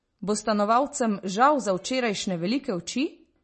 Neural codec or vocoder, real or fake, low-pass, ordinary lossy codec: none; real; 10.8 kHz; MP3, 32 kbps